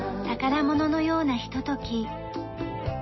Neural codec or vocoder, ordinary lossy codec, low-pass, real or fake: none; MP3, 24 kbps; 7.2 kHz; real